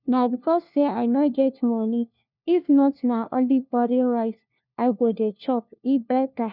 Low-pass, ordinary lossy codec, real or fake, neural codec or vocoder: 5.4 kHz; none; fake; codec, 16 kHz, 1 kbps, FunCodec, trained on LibriTTS, 50 frames a second